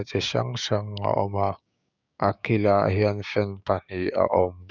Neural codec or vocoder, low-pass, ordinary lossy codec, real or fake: codec, 16 kHz, 6 kbps, DAC; 7.2 kHz; none; fake